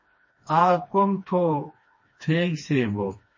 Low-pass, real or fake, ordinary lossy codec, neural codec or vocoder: 7.2 kHz; fake; MP3, 32 kbps; codec, 16 kHz, 2 kbps, FreqCodec, smaller model